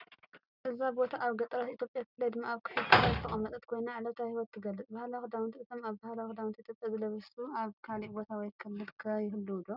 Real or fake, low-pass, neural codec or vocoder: real; 5.4 kHz; none